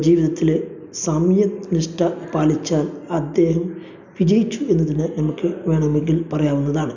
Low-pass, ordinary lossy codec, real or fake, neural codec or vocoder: 7.2 kHz; none; real; none